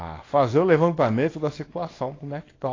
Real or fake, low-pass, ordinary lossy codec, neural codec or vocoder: fake; 7.2 kHz; AAC, 32 kbps; codec, 24 kHz, 0.9 kbps, WavTokenizer, small release